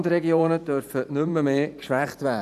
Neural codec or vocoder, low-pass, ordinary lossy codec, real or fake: vocoder, 44.1 kHz, 128 mel bands every 512 samples, BigVGAN v2; 14.4 kHz; none; fake